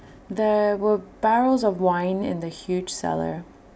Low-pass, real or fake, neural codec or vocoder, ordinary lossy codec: none; real; none; none